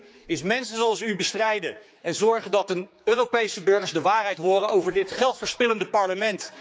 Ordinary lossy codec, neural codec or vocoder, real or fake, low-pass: none; codec, 16 kHz, 4 kbps, X-Codec, HuBERT features, trained on general audio; fake; none